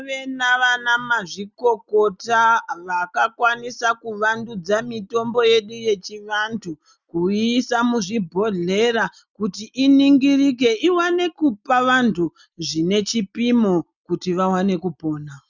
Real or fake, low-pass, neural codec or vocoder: real; 7.2 kHz; none